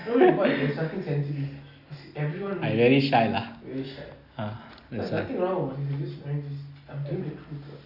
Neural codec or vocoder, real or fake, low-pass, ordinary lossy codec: none; real; 5.4 kHz; Opus, 64 kbps